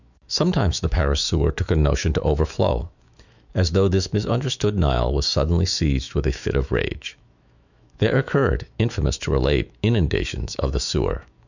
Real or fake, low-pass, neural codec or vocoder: fake; 7.2 kHz; autoencoder, 48 kHz, 128 numbers a frame, DAC-VAE, trained on Japanese speech